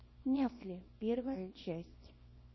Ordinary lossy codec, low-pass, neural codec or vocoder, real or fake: MP3, 24 kbps; 7.2 kHz; codec, 24 kHz, 0.9 kbps, WavTokenizer, small release; fake